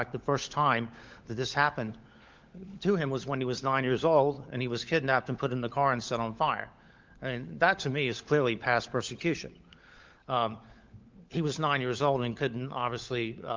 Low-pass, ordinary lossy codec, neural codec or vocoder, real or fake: 7.2 kHz; Opus, 24 kbps; codec, 16 kHz, 16 kbps, FunCodec, trained on LibriTTS, 50 frames a second; fake